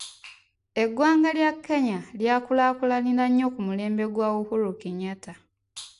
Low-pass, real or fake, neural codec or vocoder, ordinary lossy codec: 10.8 kHz; real; none; AAC, 64 kbps